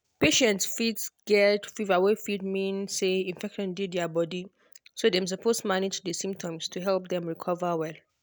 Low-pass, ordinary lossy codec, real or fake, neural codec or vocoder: none; none; real; none